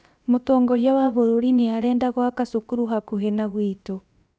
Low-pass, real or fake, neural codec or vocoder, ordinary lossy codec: none; fake; codec, 16 kHz, about 1 kbps, DyCAST, with the encoder's durations; none